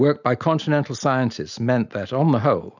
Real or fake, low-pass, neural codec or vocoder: real; 7.2 kHz; none